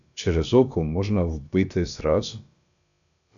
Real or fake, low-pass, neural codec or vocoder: fake; 7.2 kHz; codec, 16 kHz, about 1 kbps, DyCAST, with the encoder's durations